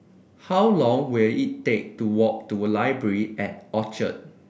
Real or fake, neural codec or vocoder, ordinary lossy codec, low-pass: real; none; none; none